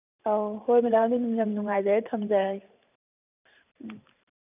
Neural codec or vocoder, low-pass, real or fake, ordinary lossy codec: vocoder, 44.1 kHz, 128 mel bands every 512 samples, BigVGAN v2; 3.6 kHz; fake; none